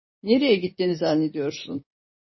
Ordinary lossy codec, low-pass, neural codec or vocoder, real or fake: MP3, 24 kbps; 7.2 kHz; vocoder, 44.1 kHz, 80 mel bands, Vocos; fake